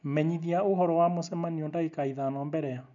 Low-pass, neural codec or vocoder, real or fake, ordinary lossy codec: 7.2 kHz; none; real; none